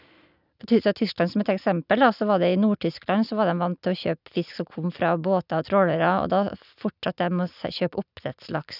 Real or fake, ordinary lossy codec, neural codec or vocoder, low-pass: real; none; none; 5.4 kHz